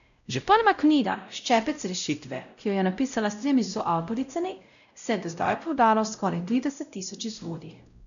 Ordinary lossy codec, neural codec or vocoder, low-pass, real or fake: none; codec, 16 kHz, 0.5 kbps, X-Codec, WavLM features, trained on Multilingual LibriSpeech; 7.2 kHz; fake